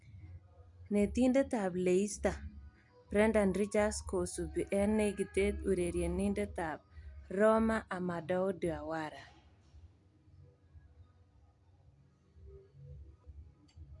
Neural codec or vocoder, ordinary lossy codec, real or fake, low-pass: none; none; real; 10.8 kHz